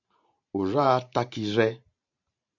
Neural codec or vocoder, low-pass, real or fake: vocoder, 44.1 kHz, 128 mel bands every 512 samples, BigVGAN v2; 7.2 kHz; fake